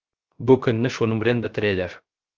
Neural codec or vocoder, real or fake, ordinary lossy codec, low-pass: codec, 16 kHz, 0.3 kbps, FocalCodec; fake; Opus, 24 kbps; 7.2 kHz